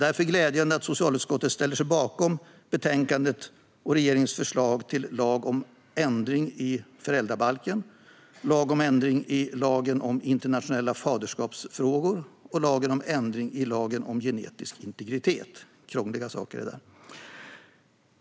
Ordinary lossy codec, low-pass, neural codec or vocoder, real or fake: none; none; none; real